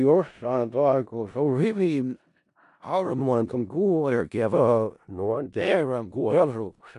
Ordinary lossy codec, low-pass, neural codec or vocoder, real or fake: none; 10.8 kHz; codec, 16 kHz in and 24 kHz out, 0.4 kbps, LongCat-Audio-Codec, four codebook decoder; fake